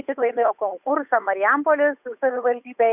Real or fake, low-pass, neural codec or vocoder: fake; 3.6 kHz; codec, 16 kHz, 8 kbps, FunCodec, trained on Chinese and English, 25 frames a second